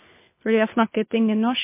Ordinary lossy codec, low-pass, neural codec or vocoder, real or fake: MP3, 24 kbps; 3.6 kHz; codec, 24 kHz, 6 kbps, HILCodec; fake